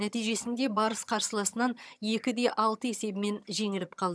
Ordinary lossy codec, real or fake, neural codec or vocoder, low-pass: none; fake; vocoder, 22.05 kHz, 80 mel bands, HiFi-GAN; none